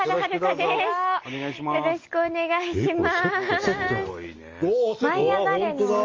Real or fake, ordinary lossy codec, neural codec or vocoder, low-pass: real; Opus, 32 kbps; none; 7.2 kHz